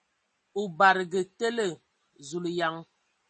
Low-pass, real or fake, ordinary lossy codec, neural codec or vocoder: 10.8 kHz; real; MP3, 32 kbps; none